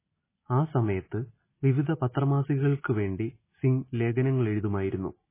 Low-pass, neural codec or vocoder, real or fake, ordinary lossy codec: 3.6 kHz; none; real; MP3, 16 kbps